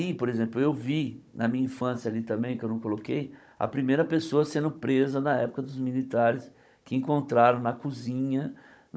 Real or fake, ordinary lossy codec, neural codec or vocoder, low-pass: fake; none; codec, 16 kHz, 16 kbps, FunCodec, trained on Chinese and English, 50 frames a second; none